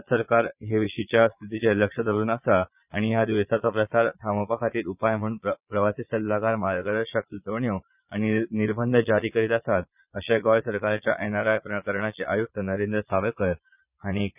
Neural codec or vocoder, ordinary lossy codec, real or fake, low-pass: vocoder, 22.05 kHz, 80 mel bands, Vocos; none; fake; 3.6 kHz